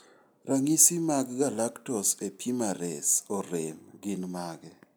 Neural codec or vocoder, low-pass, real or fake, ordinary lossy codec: none; none; real; none